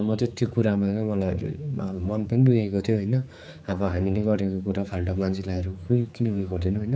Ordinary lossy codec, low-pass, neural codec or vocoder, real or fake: none; none; codec, 16 kHz, 4 kbps, X-Codec, HuBERT features, trained on general audio; fake